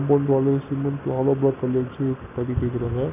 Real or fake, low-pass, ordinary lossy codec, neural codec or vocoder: real; 3.6 kHz; none; none